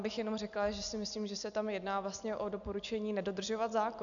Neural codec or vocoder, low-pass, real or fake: none; 7.2 kHz; real